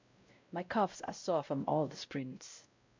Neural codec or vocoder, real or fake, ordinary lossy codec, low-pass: codec, 16 kHz, 0.5 kbps, X-Codec, WavLM features, trained on Multilingual LibriSpeech; fake; MP3, 64 kbps; 7.2 kHz